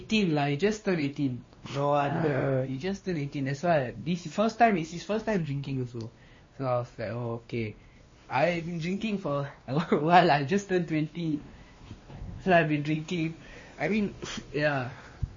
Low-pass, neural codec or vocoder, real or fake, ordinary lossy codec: 7.2 kHz; codec, 16 kHz, 2 kbps, X-Codec, WavLM features, trained on Multilingual LibriSpeech; fake; MP3, 32 kbps